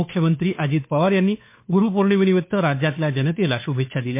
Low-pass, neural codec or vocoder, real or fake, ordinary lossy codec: 3.6 kHz; codec, 16 kHz, 2 kbps, FunCodec, trained on Chinese and English, 25 frames a second; fake; MP3, 24 kbps